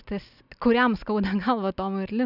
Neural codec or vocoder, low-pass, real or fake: none; 5.4 kHz; real